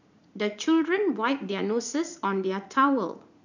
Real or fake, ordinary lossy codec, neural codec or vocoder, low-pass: fake; none; vocoder, 44.1 kHz, 80 mel bands, Vocos; 7.2 kHz